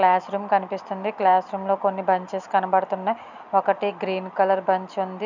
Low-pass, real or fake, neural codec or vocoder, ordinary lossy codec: 7.2 kHz; real; none; none